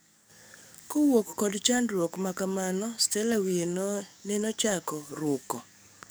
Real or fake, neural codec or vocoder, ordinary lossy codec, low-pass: fake; codec, 44.1 kHz, 7.8 kbps, DAC; none; none